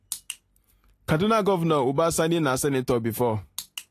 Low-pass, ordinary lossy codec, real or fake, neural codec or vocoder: 14.4 kHz; AAC, 48 kbps; real; none